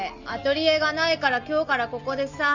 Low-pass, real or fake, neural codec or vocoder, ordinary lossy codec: 7.2 kHz; real; none; none